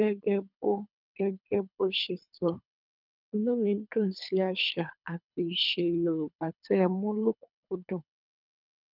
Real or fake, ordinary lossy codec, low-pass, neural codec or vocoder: fake; none; 5.4 kHz; codec, 24 kHz, 3 kbps, HILCodec